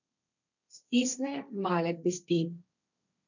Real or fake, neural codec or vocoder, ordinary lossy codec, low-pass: fake; codec, 16 kHz, 1.1 kbps, Voila-Tokenizer; none; 7.2 kHz